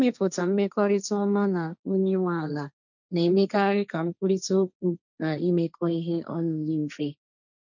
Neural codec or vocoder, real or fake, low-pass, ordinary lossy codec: codec, 16 kHz, 1.1 kbps, Voila-Tokenizer; fake; 7.2 kHz; none